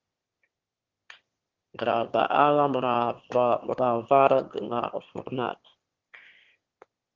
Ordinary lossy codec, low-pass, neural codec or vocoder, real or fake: Opus, 16 kbps; 7.2 kHz; autoencoder, 22.05 kHz, a latent of 192 numbers a frame, VITS, trained on one speaker; fake